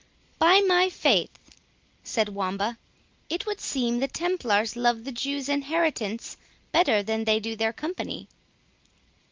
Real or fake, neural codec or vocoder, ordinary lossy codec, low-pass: real; none; Opus, 32 kbps; 7.2 kHz